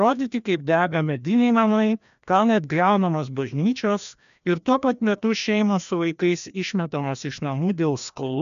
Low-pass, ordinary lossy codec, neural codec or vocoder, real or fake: 7.2 kHz; MP3, 96 kbps; codec, 16 kHz, 1 kbps, FreqCodec, larger model; fake